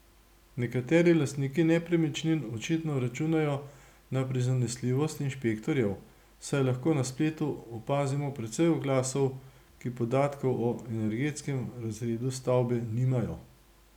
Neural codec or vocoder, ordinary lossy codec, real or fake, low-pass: none; none; real; 19.8 kHz